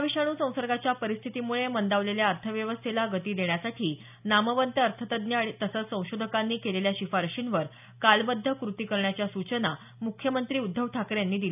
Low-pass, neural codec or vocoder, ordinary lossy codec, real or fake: 3.6 kHz; none; none; real